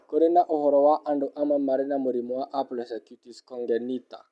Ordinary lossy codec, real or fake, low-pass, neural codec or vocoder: none; real; none; none